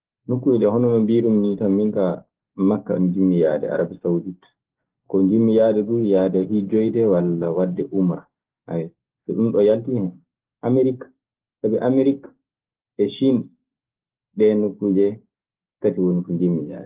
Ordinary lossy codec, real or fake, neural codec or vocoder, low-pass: Opus, 16 kbps; real; none; 3.6 kHz